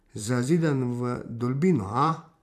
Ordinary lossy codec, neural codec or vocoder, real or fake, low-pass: none; vocoder, 44.1 kHz, 128 mel bands every 512 samples, BigVGAN v2; fake; 14.4 kHz